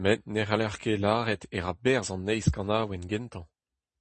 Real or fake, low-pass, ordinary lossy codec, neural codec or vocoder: real; 10.8 kHz; MP3, 32 kbps; none